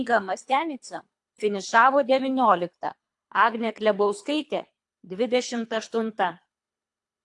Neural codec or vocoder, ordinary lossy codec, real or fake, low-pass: codec, 24 kHz, 3 kbps, HILCodec; AAC, 64 kbps; fake; 10.8 kHz